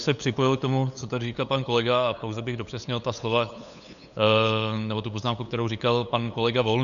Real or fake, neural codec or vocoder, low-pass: fake; codec, 16 kHz, 8 kbps, FunCodec, trained on LibriTTS, 25 frames a second; 7.2 kHz